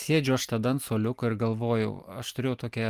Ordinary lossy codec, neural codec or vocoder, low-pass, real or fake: Opus, 32 kbps; none; 14.4 kHz; real